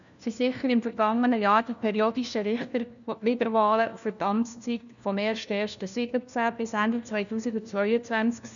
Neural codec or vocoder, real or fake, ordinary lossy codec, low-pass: codec, 16 kHz, 1 kbps, FunCodec, trained on LibriTTS, 50 frames a second; fake; none; 7.2 kHz